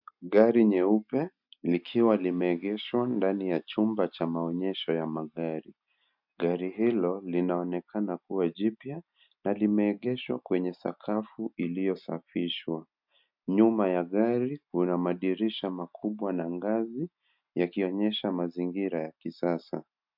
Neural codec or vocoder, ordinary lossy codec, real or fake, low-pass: none; MP3, 48 kbps; real; 5.4 kHz